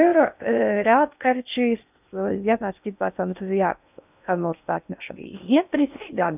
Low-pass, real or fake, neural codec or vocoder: 3.6 kHz; fake; codec, 16 kHz in and 24 kHz out, 0.6 kbps, FocalCodec, streaming, 4096 codes